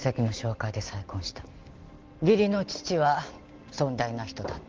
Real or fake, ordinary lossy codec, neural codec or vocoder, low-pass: real; Opus, 16 kbps; none; 7.2 kHz